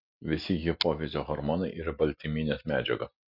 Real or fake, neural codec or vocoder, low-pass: real; none; 5.4 kHz